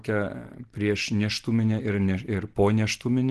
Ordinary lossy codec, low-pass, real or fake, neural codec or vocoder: Opus, 16 kbps; 10.8 kHz; real; none